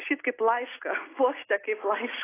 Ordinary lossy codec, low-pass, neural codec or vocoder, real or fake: AAC, 16 kbps; 3.6 kHz; none; real